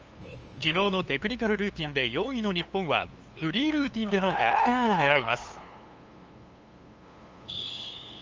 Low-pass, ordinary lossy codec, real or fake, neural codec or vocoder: 7.2 kHz; Opus, 24 kbps; fake; codec, 16 kHz, 2 kbps, FunCodec, trained on LibriTTS, 25 frames a second